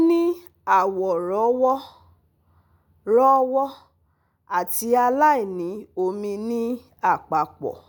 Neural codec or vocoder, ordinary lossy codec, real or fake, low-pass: none; none; real; none